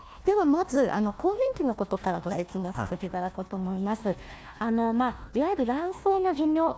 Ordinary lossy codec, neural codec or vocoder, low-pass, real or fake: none; codec, 16 kHz, 1 kbps, FunCodec, trained on Chinese and English, 50 frames a second; none; fake